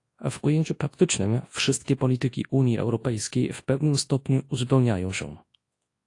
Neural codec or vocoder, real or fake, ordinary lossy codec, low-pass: codec, 24 kHz, 0.9 kbps, WavTokenizer, large speech release; fake; AAC, 48 kbps; 10.8 kHz